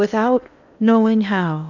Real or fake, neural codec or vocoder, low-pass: fake; codec, 16 kHz in and 24 kHz out, 0.8 kbps, FocalCodec, streaming, 65536 codes; 7.2 kHz